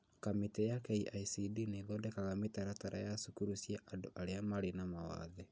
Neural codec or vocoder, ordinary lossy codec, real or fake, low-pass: none; none; real; none